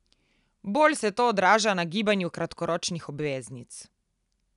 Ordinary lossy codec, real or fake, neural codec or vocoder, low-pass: none; real; none; 10.8 kHz